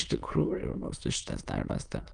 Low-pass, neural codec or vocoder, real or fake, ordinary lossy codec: 9.9 kHz; autoencoder, 22.05 kHz, a latent of 192 numbers a frame, VITS, trained on many speakers; fake; Opus, 32 kbps